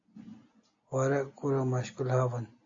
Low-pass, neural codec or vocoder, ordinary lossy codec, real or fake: 7.2 kHz; none; AAC, 48 kbps; real